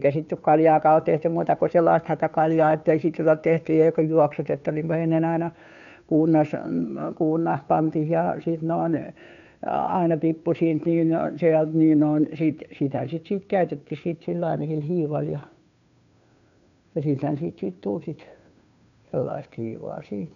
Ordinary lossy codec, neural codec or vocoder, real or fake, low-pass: MP3, 96 kbps; codec, 16 kHz, 2 kbps, FunCodec, trained on Chinese and English, 25 frames a second; fake; 7.2 kHz